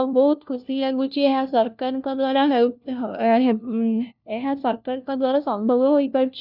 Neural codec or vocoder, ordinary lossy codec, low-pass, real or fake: codec, 16 kHz, 1 kbps, FunCodec, trained on LibriTTS, 50 frames a second; none; 5.4 kHz; fake